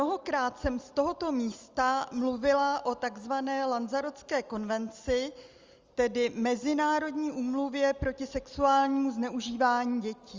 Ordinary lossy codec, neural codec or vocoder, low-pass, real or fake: Opus, 32 kbps; none; 7.2 kHz; real